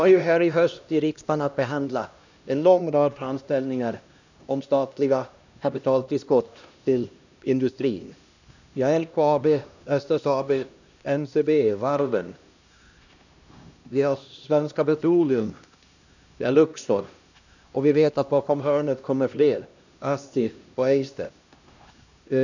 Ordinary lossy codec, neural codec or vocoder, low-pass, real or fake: none; codec, 16 kHz, 1 kbps, X-Codec, HuBERT features, trained on LibriSpeech; 7.2 kHz; fake